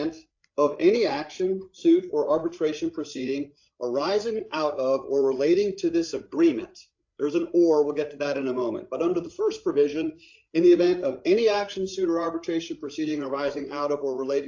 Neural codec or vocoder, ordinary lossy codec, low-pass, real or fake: codec, 16 kHz, 8 kbps, FreqCodec, larger model; AAC, 48 kbps; 7.2 kHz; fake